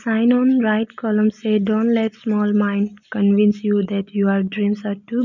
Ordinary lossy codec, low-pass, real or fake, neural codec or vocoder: none; 7.2 kHz; real; none